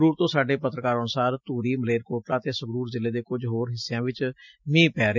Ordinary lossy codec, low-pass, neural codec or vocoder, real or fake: none; 7.2 kHz; none; real